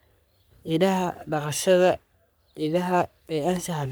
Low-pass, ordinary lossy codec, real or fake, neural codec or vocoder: none; none; fake; codec, 44.1 kHz, 3.4 kbps, Pupu-Codec